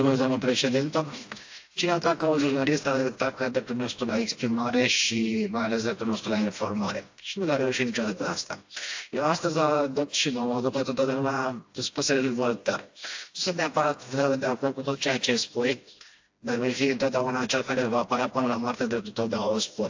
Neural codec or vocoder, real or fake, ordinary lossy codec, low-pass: codec, 16 kHz, 1 kbps, FreqCodec, smaller model; fake; AAC, 48 kbps; 7.2 kHz